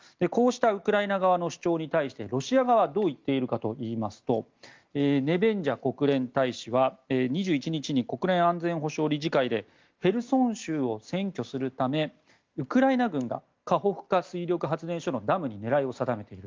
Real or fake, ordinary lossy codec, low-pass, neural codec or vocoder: real; Opus, 32 kbps; 7.2 kHz; none